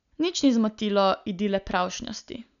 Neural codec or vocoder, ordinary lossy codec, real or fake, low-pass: none; none; real; 7.2 kHz